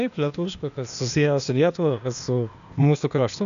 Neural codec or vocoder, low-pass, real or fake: codec, 16 kHz, 0.8 kbps, ZipCodec; 7.2 kHz; fake